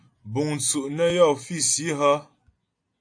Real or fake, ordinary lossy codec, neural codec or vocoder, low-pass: real; AAC, 64 kbps; none; 9.9 kHz